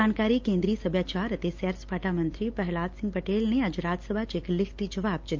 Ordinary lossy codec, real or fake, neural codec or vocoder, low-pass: Opus, 32 kbps; real; none; 7.2 kHz